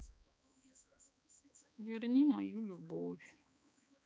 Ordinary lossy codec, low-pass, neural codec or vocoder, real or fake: none; none; codec, 16 kHz, 2 kbps, X-Codec, HuBERT features, trained on balanced general audio; fake